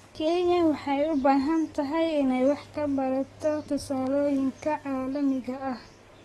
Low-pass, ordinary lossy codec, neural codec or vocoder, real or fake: 19.8 kHz; AAC, 32 kbps; codec, 44.1 kHz, 7.8 kbps, DAC; fake